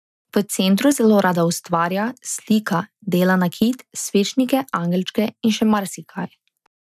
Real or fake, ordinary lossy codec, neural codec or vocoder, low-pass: real; none; none; 14.4 kHz